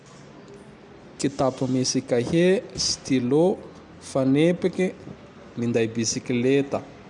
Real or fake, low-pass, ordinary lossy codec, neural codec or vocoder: real; 10.8 kHz; none; none